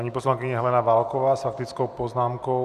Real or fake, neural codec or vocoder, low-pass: real; none; 14.4 kHz